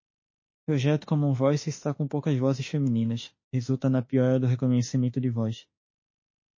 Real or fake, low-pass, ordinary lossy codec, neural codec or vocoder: fake; 7.2 kHz; MP3, 32 kbps; autoencoder, 48 kHz, 32 numbers a frame, DAC-VAE, trained on Japanese speech